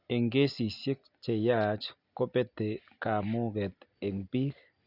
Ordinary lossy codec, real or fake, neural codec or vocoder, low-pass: none; real; none; 5.4 kHz